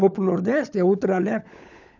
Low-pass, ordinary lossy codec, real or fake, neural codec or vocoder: 7.2 kHz; none; fake; codec, 16 kHz, 16 kbps, FunCodec, trained on LibriTTS, 50 frames a second